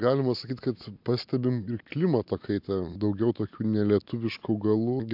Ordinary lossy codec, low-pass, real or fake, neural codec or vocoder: MP3, 48 kbps; 5.4 kHz; real; none